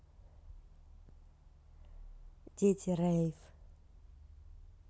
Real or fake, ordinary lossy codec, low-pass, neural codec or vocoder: real; none; none; none